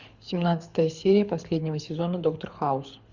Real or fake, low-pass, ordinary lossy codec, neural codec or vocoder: fake; 7.2 kHz; Opus, 64 kbps; codec, 24 kHz, 6 kbps, HILCodec